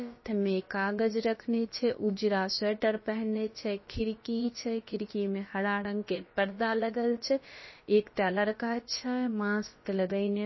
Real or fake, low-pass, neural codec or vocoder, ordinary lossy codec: fake; 7.2 kHz; codec, 16 kHz, about 1 kbps, DyCAST, with the encoder's durations; MP3, 24 kbps